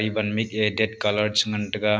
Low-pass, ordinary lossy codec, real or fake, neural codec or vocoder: none; none; real; none